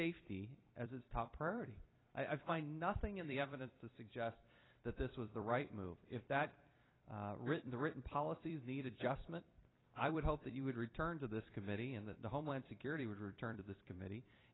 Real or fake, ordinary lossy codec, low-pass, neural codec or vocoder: fake; AAC, 16 kbps; 7.2 kHz; vocoder, 44.1 kHz, 128 mel bands every 256 samples, BigVGAN v2